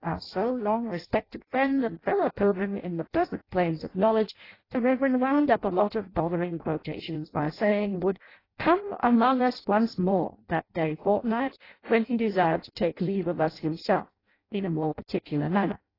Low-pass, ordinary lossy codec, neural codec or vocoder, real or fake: 5.4 kHz; AAC, 24 kbps; codec, 16 kHz in and 24 kHz out, 0.6 kbps, FireRedTTS-2 codec; fake